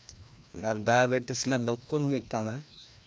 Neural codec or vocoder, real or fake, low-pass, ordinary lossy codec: codec, 16 kHz, 1 kbps, FreqCodec, larger model; fake; none; none